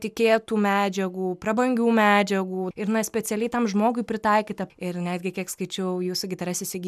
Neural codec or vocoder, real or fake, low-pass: none; real; 14.4 kHz